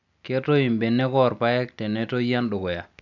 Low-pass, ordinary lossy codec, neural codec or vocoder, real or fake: 7.2 kHz; none; none; real